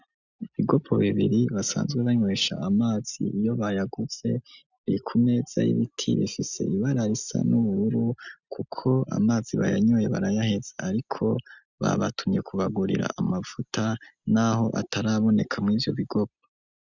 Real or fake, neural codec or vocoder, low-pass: real; none; 7.2 kHz